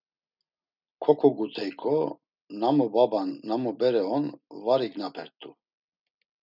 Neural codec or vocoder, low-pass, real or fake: none; 5.4 kHz; real